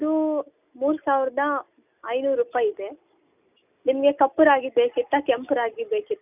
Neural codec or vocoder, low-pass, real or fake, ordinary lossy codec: none; 3.6 kHz; real; none